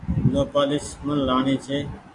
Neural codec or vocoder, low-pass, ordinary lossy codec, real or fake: none; 10.8 kHz; AAC, 48 kbps; real